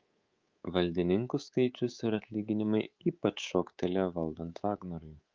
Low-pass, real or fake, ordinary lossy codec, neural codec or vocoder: 7.2 kHz; fake; Opus, 32 kbps; codec, 24 kHz, 3.1 kbps, DualCodec